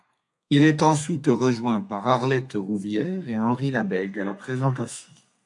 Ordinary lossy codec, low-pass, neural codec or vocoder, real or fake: AAC, 64 kbps; 10.8 kHz; codec, 32 kHz, 1.9 kbps, SNAC; fake